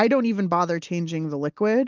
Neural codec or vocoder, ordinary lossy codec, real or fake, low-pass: none; Opus, 32 kbps; real; 7.2 kHz